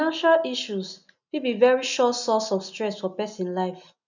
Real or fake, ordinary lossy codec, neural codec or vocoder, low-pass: real; none; none; 7.2 kHz